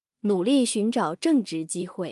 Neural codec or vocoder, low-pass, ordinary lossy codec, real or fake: codec, 16 kHz in and 24 kHz out, 0.4 kbps, LongCat-Audio-Codec, two codebook decoder; 10.8 kHz; Opus, 32 kbps; fake